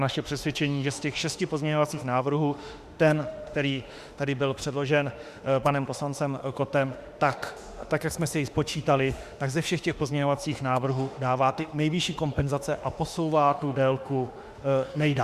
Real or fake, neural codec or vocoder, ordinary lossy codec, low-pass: fake; autoencoder, 48 kHz, 32 numbers a frame, DAC-VAE, trained on Japanese speech; Opus, 64 kbps; 14.4 kHz